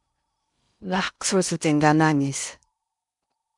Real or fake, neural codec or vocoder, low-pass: fake; codec, 16 kHz in and 24 kHz out, 0.6 kbps, FocalCodec, streaming, 2048 codes; 10.8 kHz